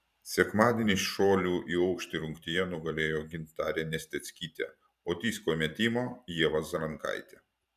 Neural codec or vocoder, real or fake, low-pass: vocoder, 44.1 kHz, 128 mel bands every 512 samples, BigVGAN v2; fake; 14.4 kHz